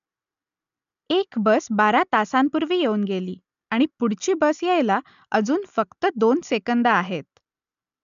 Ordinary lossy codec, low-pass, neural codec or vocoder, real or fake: none; 7.2 kHz; none; real